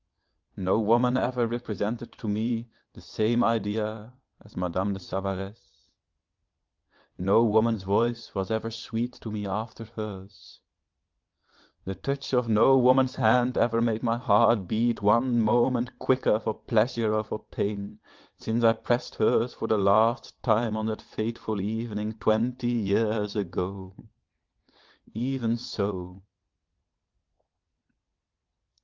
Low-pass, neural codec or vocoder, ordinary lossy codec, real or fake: 7.2 kHz; vocoder, 22.05 kHz, 80 mel bands, WaveNeXt; Opus, 24 kbps; fake